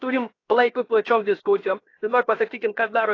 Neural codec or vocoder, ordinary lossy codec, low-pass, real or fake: codec, 16 kHz, 0.7 kbps, FocalCodec; AAC, 32 kbps; 7.2 kHz; fake